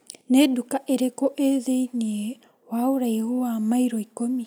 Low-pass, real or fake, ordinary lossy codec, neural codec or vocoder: none; real; none; none